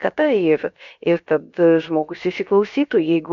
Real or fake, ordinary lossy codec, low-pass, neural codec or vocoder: fake; MP3, 64 kbps; 7.2 kHz; codec, 16 kHz, about 1 kbps, DyCAST, with the encoder's durations